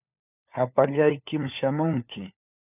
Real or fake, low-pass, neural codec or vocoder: fake; 3.6 kHz; codec, 16 kHz, 4 kbps, FunCodec, trained on LibriTTS, 50 frames a second